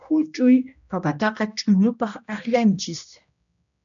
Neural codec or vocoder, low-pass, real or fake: codec, 16 kHz, 1 kbps, X-Codec, HuBERT features, trained on general audio; 7.2 kHz; fake